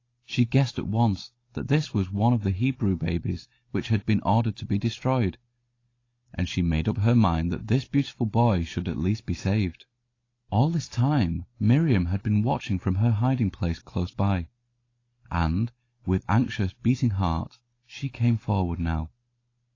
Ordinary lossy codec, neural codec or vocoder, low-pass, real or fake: AAC, 32 kbps; none; 7.2 kHz; real